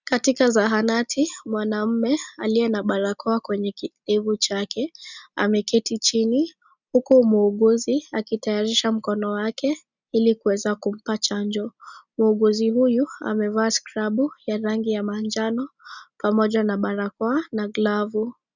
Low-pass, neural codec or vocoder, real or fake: 7.2 kHz; none; real